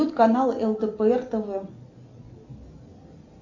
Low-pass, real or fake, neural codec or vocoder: 7.2 kHz; real; none